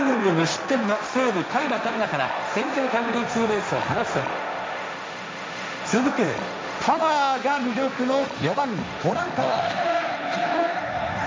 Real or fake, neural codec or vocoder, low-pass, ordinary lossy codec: fake; codec, 16 kHz, 1.1 kbps, Voila-Tokenizer; none; none